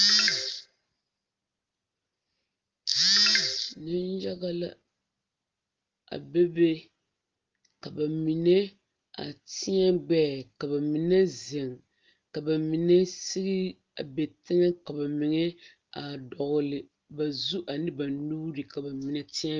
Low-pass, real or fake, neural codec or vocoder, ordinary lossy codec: 7.2 kHz; real; none; Opus, 24 kbps